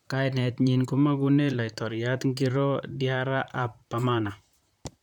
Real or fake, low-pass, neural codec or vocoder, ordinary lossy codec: fake; 19.8 kHz; vocoder, 44.1 kHz, 128 mel bands, Pupu-Vocoder; none